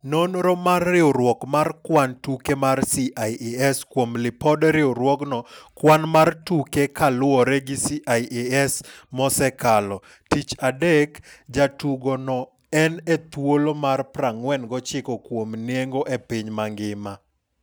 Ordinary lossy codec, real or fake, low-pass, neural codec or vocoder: none; real; none; none